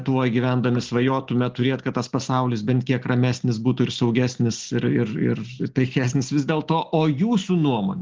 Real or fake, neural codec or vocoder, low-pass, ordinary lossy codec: real; none; 7.2 kHz; Opus, 16 kbps